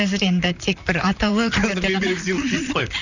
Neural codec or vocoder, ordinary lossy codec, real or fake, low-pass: vocoder, 44.1 kHz, 128 mel bands, Pupu-Vocoder; none; fake; 7.2 kHz